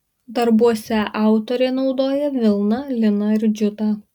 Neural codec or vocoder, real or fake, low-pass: none; real; 19.8 kHz